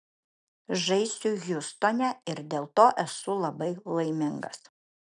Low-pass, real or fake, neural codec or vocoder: 10.8 kHz; real; none